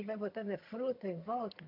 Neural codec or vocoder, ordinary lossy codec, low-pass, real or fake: vocoder, 22.05 kHz, 80 mel bands, HiFi-GAN; MP3, 48 kbps; 5.4 kHz; fake